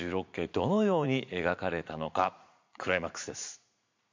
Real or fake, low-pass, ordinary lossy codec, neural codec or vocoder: fake; 7.2 kHz; MP3, 64 kbps; vocoder, 44.1 kHz, 80 mel bands, Vocos